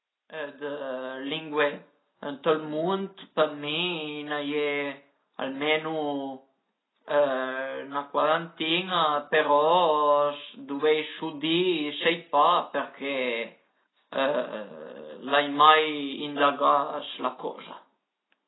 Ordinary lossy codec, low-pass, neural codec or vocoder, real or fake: AAC, 16 kbps; 7.2 kHz; none; real